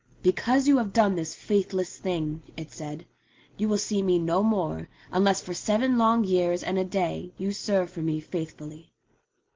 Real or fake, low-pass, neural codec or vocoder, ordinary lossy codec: real; 7.2 kHz; none; Opus, 16 kbps